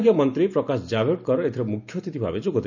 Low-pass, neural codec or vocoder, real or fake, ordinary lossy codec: 7.2 kHz; none; real; none